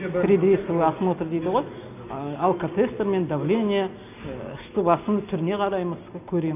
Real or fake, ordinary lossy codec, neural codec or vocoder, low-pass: real; none; none; 3.6 kHz